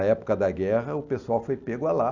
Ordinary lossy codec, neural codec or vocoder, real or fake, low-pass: Opus, 64 kbps; none; real; 7.2 kHz